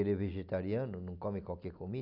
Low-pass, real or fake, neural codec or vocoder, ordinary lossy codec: 5.4 kHz; real; none; none